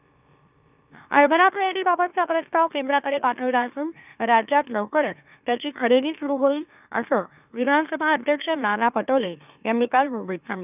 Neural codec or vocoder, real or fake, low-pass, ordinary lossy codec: autoencoder, 44.1 kHz, a latent of 192 numbers a frame, MeloTTS; fake; 3.6 kHz; none